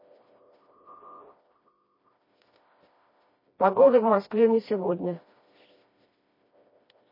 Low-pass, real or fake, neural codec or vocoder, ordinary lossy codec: 5.4 kHz; fake; codec, 16 kHz, 1 kbps, FreqCodec, smaller model; MP3, 24 kbps